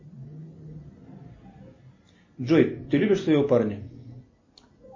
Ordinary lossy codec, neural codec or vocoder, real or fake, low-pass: MP3, 32 kbps; none; real; 7.2 kHz